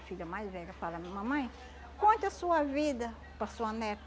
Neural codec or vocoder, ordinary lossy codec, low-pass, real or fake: none; none; none; real